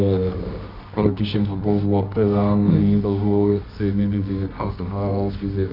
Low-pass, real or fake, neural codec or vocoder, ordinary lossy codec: 5.4 kHz; fake; codec, 24 kHz, 0.9 kbps, WavTokenizer, medium music audio release; none